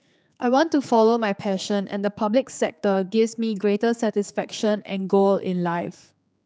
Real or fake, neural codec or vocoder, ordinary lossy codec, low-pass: fake; codec, 16 kHz, 4 kbps, X-Codec, HuBERT features, trained on general audio; none; none